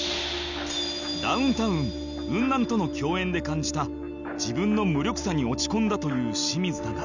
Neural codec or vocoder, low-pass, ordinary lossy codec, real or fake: none; 7.2 kHz; none; real